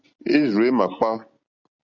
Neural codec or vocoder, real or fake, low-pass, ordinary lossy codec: none; real; 7.2 kHz; Opus, 64 kbps